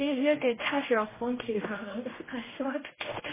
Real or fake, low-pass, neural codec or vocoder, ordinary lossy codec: fake; 3.6 kHz; codec, 16 kHz, 1 kbps, X-Codec, HuBERT features, trained on general audio; MP3, 16 kbps